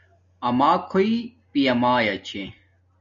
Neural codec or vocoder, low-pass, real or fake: none; 7.2 kHz; real